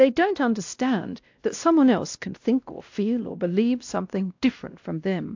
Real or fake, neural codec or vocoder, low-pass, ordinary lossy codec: fake; codec, 16 kHz, 1 kbps, X-Codec, WavLM features, trained on Multilingual LibriSpeech; 7.2 kHz; AAC, 48 kbps